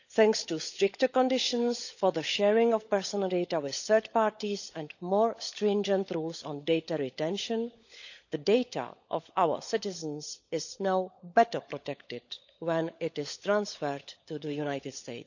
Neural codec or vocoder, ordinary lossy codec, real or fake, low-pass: codec, 16 kHz, 16 kbps, FunCodec, trained on LibriTTS, 50 frames a second; none; fake; 7.2 kHz